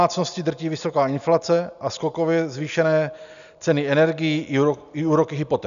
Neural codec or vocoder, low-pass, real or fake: none; 7.2 kHz; real